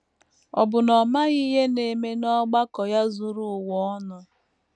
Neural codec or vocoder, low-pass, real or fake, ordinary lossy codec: none; none; real; none